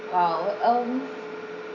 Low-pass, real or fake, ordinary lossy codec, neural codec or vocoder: 7.2 kHz; real; none; none